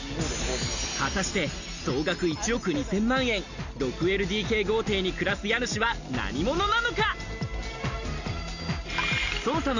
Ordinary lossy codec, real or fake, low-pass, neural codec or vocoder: none; real; 7.2 kHz; none